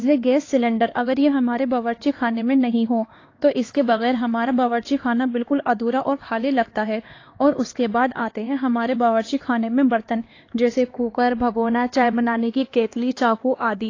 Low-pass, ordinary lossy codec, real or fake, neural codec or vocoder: 7.2 kHz; AAC, 32 kbps; fake; codec, 16 kHz, 2 kbps, X-Codec, HuBERT features, trained on LibriSpeech